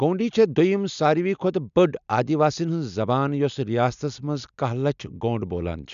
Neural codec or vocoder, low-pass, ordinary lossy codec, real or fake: none; 7.2 kHz; MP3, 96 kbps; real